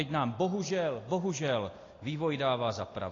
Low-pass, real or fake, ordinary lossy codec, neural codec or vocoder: 7.2 kHz; real; AAC, 32 kbps; none